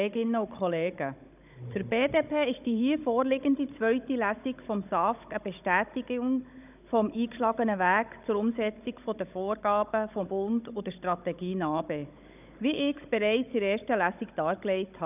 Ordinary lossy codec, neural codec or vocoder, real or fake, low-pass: none; codec, 16 kHz, 16 kbps, FunCodec, trained on Chinese and English, 50 frames a second; fake; 3.6 kHz